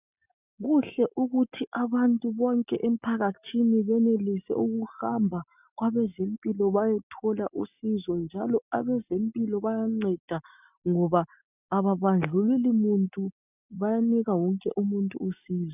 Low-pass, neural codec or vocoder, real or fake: 3.6 kHz; none; real